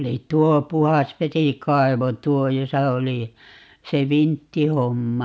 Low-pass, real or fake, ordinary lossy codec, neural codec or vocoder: none; real; none; none